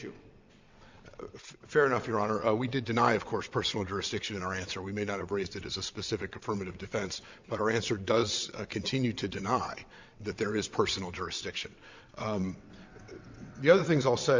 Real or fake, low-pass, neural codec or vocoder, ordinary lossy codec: fake; 7.2 kHz; vocoder, 22.05 kHz, 80 mel bands, WaveNeXt; MP3, 64 kbps